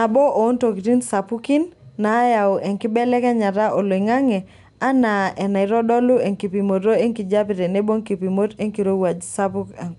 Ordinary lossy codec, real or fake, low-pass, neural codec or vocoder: none; real; 10.8 kHz; none